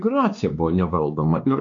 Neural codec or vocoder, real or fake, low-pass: codec, 16 kHz, 2 kbps, X-Codec, WavLM features, trained on Multilingual LibriSpeech; fake; 7.2 kHz